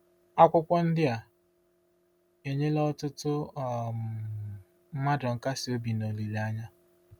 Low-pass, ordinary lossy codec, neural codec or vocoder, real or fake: 19.8 kHz; none; none; real